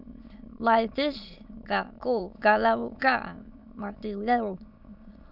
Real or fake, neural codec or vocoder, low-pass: fake; autoencoder, 22.05 kHz, a latent of 192 numbers a frame, VITS, trained on many speakers; 5.4 kHz